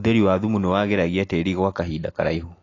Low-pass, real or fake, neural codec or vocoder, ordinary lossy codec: 7.2 kHz; real; none; AAC, 32 kbps